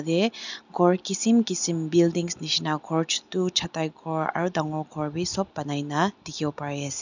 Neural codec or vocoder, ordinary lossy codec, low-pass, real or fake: none; none; 7.2 kHz; real